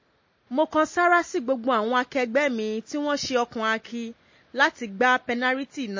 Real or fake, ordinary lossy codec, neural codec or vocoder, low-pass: real; MP3, 32 kbps; none; 7.2 kHz